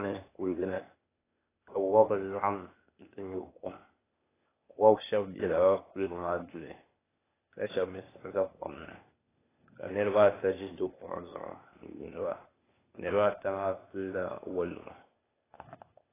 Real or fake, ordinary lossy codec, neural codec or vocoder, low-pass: fake; AAC, 16 kbps; codec, 16 kHz, 0.8 kbps, ZipCodec; 3.6 kHz